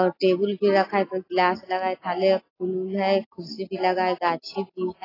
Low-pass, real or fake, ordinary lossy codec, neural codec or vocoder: 5.4 kHz; real; AAC, 24 kbps; none